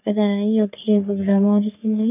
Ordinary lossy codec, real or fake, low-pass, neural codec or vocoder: none; fake; 3.6 kHz; codec, 44.1 kHz, 3.4 kbps, Pupu-Codec